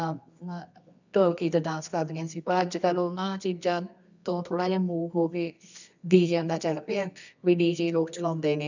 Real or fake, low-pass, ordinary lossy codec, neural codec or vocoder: fake; 7.2 kHz; none; codec, 24 kHz, 0.9 kbps, WavTokenizer, medium music audio release